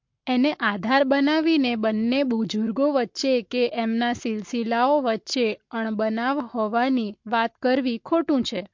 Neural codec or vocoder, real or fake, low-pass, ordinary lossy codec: codec, 44.1 kHz, 7.8 kbps, Pupu-Codec; fake; 7.2 kHz; MP3, 48 kbps